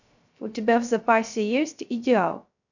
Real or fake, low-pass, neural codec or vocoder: fake; 7.2 kHz; codec, 16 kHz, 0.3 kbps, FocalCodec